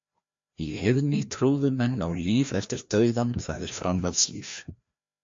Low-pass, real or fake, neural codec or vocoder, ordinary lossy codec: 7.2 kHz; fake; codec, 16 kHz, 1 kbps, FreqCodec, larger model; MP3, 48 kbps